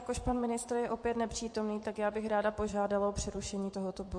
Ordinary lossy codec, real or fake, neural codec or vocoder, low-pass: MP3, 48 kbps; fake; vocoder, 24 kHz, 100 mel bands, Vocos; 9.9 kHz